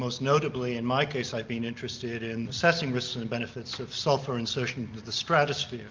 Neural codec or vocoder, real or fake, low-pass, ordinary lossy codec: none; real; 7.2 kHz; Opus, 24 kbps